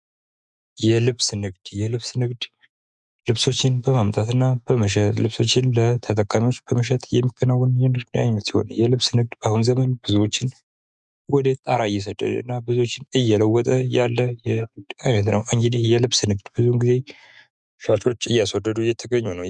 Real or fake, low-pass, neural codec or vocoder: fake; 10.8 kHz; autoencoder, 48 kHz, 128 numbers a frame, DAC-VAE, trained on Japanese speech